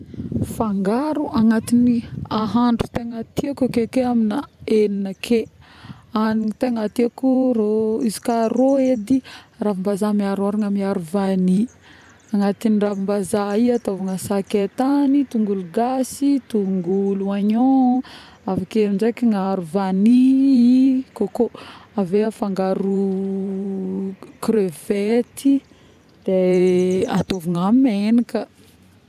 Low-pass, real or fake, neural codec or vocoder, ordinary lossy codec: 14.4 kHz; fake; vocoder, 44.1 kHz, 128 mel bands every 512 samples, BigVGAN v2; none